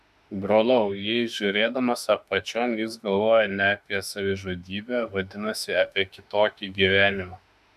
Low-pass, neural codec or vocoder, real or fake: 14.4 kHz; autoencoder, 48 kHz, 32 numbers a frame, DAC-VAE, trained on Japanese speech; fake